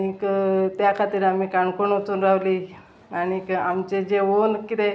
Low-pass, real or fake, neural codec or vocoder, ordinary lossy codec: none; real; none; none